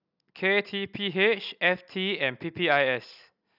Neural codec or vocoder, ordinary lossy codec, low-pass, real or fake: none; none; 5.4 kHz; real